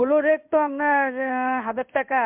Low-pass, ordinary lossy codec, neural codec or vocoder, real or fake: 3.6 kHz; MP3, 32 kbps; codec, 16 kHz in and 24 kHz out, 1 kbps, XY-Tokenizer; fake